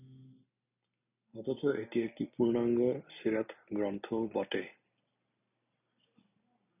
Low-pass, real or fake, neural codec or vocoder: 3.6 kHz; real; none